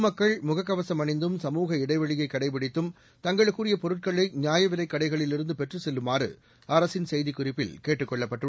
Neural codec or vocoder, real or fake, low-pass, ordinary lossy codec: none; real; none; none